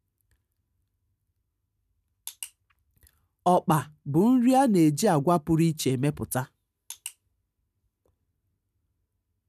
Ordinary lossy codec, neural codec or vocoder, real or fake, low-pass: none; none; real; 14.4 kHz